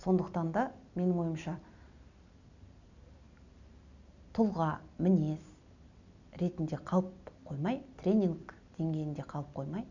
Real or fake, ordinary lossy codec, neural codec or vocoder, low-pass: real; none; none; 7.2 kHz